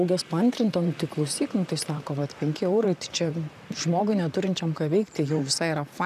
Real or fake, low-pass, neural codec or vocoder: fake; 14.4 kHz; vocoder, 44.1 kHz, 128 mel bands, Pupu-Vocoder